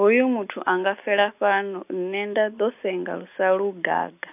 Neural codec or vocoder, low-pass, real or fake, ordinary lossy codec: none; 3.6 kHz; real; AAC, 32 kbps